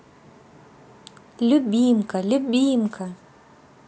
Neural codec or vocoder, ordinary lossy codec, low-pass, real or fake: none; none; none; real